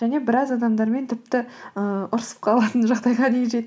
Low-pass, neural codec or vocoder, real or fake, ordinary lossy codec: none; none; real; none